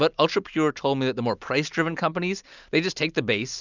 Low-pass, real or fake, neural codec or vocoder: 7.2 kHz; real; none